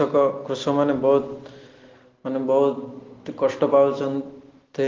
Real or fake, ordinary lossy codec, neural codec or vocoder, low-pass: real; Opus, 16 kbps; none; 7.2 kHz